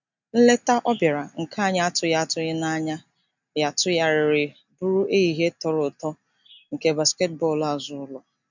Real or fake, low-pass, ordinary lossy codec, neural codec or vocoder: real; 7.2 kHz; none; none